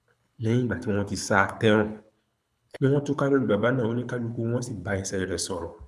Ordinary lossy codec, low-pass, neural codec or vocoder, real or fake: none; none; codec, 24 kHz, 6 kbps, HILCodec; fake